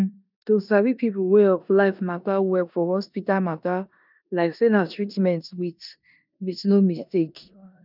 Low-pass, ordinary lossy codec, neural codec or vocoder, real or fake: 5.4 kHz; none; codec, 16 kHz in and 24 kHz out, 0.9 kbps, LongCat-Audio-Codec, four codebook decoder; fake